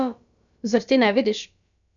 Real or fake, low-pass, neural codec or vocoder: fake; 7.2 kHz; codec, 16 kHz, about 1 kbps, DyCAST, with the encoder's durations